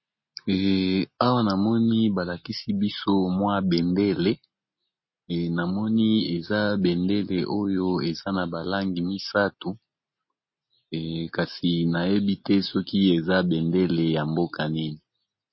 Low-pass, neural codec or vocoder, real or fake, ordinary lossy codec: 7.2 kHz; none; real; MP3, 24 kbps